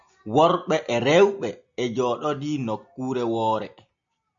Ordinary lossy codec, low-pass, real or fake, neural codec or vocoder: MP3, 96 kbps; 7.2 kHz; real; none